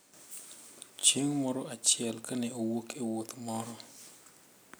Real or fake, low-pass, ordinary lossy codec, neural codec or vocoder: real; none; none; none